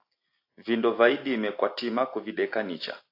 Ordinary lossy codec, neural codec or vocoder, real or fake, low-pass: AAC, 32 kbps; none; real; 5.4 kHz